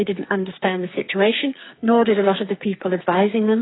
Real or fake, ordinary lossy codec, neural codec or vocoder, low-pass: fake; AAC, 16 kbps; codec, 44.1 kHz, 2.6 kbps, SNAC; 7.2 kHz